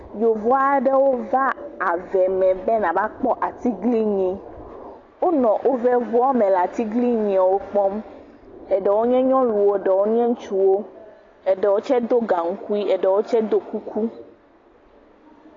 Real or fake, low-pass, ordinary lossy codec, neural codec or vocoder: real; 7.2 kHz; AAC, 32 kbps; none